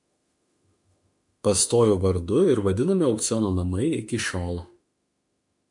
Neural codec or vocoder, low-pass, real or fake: autoencoder, 48 kHz, 32 numbers a frame, DAC-VAE, trained on Japanese speech; 10.8 kHz; fake